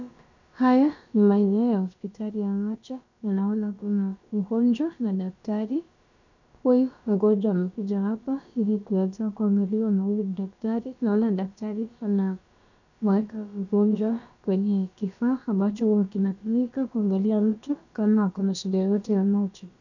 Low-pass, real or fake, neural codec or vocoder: 7.2 kHz; fake; codec, 16 kHz, about 1 kbps, DyCAST, with the encoder's durations